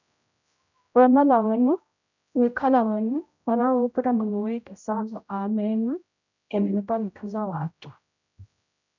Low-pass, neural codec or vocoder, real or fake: 7.2 kHz; codec, 16 kHz, 0.5 kbps, X-Codec, HuBERT features, trained on general audio; fake